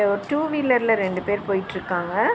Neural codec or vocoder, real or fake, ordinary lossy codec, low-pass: none; real; none; none